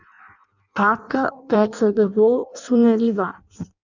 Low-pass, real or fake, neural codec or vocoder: 7.2 kHz; fake; codec, 16 kHz in and 24 kHz out, 0.6 kbps, FireRedTTS-2 codec